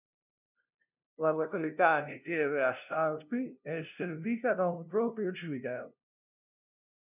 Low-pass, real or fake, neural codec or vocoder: 3.6 kHz; fake; codec, 16 kHz, 0.5 kbps, FunCodec, trained on LibriTTS, 25 frames a second